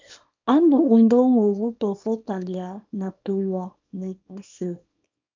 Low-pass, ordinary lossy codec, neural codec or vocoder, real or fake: 7.2 kHz; MP3, 64 kbps; codec, 24 kHz, 0.9 kbps, WavTokenizer, small release; fake